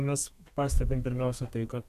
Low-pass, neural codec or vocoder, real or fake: 14.4 kHz; codec, 32 kHz, 1.9 kbps, SNAC; fake